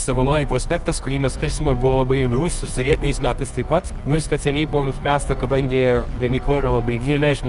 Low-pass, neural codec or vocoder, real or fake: 10.8 kHz; codec, 24 kHz, 0.9 kbps, WavTokenizer, medium music audio release; fake